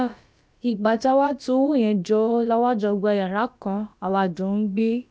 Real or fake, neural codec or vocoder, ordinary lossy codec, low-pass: fake; codec, 16 kHz, about 1 kbps, DyCAST, with the encoder's durations; none; none